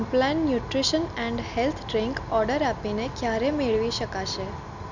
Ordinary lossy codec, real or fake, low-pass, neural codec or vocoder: none; real; 7.2 kHz; none